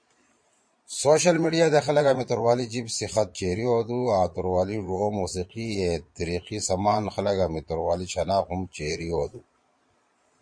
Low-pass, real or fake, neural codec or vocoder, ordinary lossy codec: 9.9 kHz; fake; vocoder, 22.05 kHz, 80 mel bands, Vocos; MP3, 48 kbps